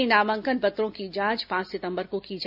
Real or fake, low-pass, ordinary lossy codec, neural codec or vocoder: real; 5.4 kHz; none; none